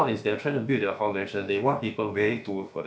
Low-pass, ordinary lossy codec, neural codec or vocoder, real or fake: none; none; codec, 16 kHz, about 1 kbps, DyCAST, with the encoder's durations; fake